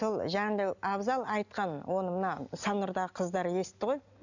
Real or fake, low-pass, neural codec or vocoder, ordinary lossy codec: real; 7.2 kHz; none; none